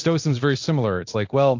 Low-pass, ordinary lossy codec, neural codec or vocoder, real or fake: 7.2 kHz; AAC, 48 kbps; codec, 24 kHz, 0.9 kbps, DualCodec; fake